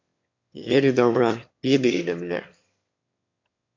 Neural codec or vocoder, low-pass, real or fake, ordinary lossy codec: autoencoder, 22.05 kHz, a latent of 192 numbers a frame, VITS, trained on one speaker; 7.2 kHz; fake; MP3, 48 kbps